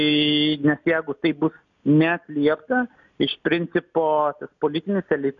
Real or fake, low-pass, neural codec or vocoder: real; 7.2 kHz; none